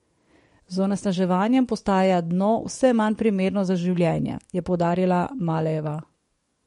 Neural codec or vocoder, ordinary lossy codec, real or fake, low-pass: codec, 44.1 kHz, 7.8 kbps, DAC; MP3, 48 kbps; fake; 19.8 kHz